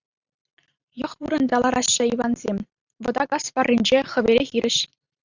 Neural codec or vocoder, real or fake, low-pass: vocoder, 44.1 kHz, 128 mel bands every 512 samples, BigVGAN v2; fake; 7.2 kHz